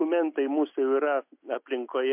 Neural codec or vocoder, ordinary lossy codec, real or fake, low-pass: none; MP3, 32 kbps; real; 3.6 kHz